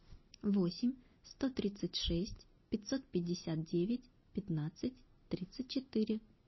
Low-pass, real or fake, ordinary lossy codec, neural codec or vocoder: 7.2 kHz; real; MP3, 24 kbps; none